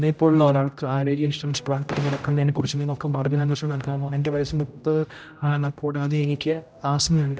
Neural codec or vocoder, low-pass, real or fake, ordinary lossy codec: codec, 16 kHz, 0.5 kbps, X-Codec, HuBERT features, trained on general audio; none; fake; none